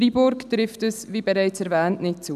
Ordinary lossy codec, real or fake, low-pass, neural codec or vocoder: none; real; 14.4 kHz; none